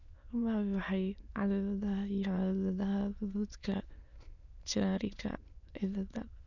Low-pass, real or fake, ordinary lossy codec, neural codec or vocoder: 7.2 kHz; fake; none; autoencoder, 22.05 kHz, a latent of 192 numbers a frame, VITS, trained on many speakers